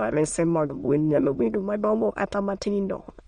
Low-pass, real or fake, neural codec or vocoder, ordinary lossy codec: 9.9 kHz; fake; autoencoder, 22.05 kHz, a latent of 192 numbers a frame, VITS, trained on many speakers; MP3, 48 kbps